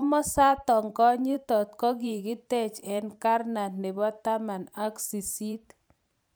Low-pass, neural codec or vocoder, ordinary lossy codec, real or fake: none; vocoder, 44.1 kHz, 128 mel bands every 512 samples, BigVGAN v2; none; fake